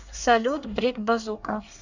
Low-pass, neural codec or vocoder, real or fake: 7.2 kHz; codec, 24 kHz, 1 kbps, SNAC; fake